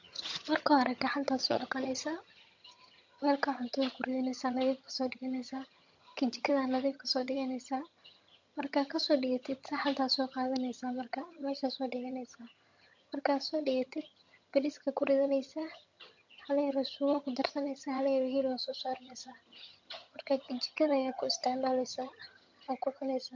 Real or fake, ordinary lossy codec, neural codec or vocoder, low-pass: fake; MP3, 48 kbps; vocoder, 22.05 kHz, 80 mel bands, HiFi-GAN; 7.2 kHz